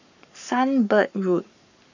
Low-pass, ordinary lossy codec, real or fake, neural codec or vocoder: 7.2 kHz; none; fake; codec, 44.1 kHz, 7.8 kbps, Pupu-Codec